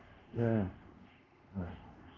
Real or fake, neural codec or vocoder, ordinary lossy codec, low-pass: fake; codec, 24 kHz, 0.5 kbps, DualCodec; Opus, 32 kbps; 7.2 kHz